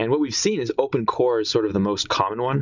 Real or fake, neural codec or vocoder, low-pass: real; none; 7.2 kHz